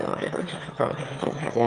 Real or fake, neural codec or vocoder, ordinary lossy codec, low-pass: fake; autoencoder, 22.05 kHz, a latent of 192 numbers a frame, VITS, trained on one speaker; Opus, 32 kbps; 9.9 kHz